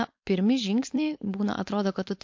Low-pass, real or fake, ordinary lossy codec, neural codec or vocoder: 7.2 kHz; fake; MP3, 48 kbps; codec, 16 kHz, 4.8 kbps, FACodec